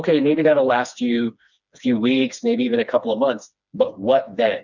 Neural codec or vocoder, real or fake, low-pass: codec, 16 kHz, 2 kbps, FreqCodec, smaller model; fake; 7.2 kHz